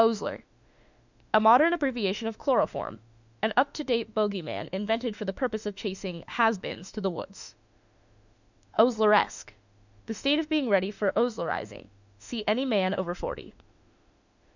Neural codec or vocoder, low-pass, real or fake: autoencoder, 48 kHz, 32 numbers a frame, DAC-VAE, trained on Japanese speech; 7.2 kHz; fake